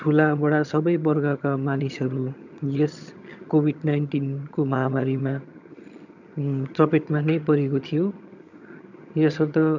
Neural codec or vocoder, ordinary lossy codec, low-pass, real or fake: vocoder, 22.05 kHz, 80 mel bands, HiFi-GAN; none; 7.2 kHz; fake